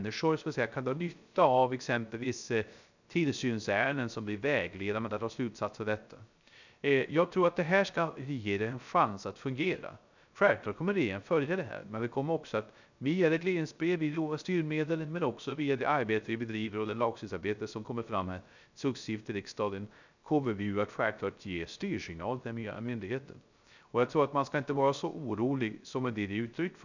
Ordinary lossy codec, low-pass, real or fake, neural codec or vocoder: none; 7.2 kHz; fake; codec, 16 kHz, 0.3 kbps, FocalCodec